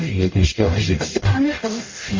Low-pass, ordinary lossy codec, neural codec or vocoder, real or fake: 7.2 kHz; MP3, 32 kbps; codec, 44.1 kHz, 0.9 kbps, DAC; fake